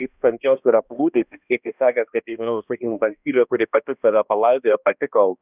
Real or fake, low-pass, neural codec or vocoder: fake; 3.6 kHz; codec, 16 kHz, 1 kbps, X-Codec, HuBERT features, trained on balanced general audio